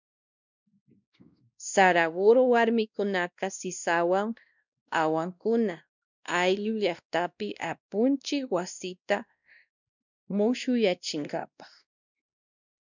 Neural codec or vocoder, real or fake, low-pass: codec, 16 kHz, 1 kbps, X-Codec, WavLM features, trained on Multilingual LibriSpeech; fake; 7.2 kHz